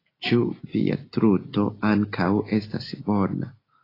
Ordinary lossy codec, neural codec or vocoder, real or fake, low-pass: AAC, 24 kbps; none; real; 5.4 kHz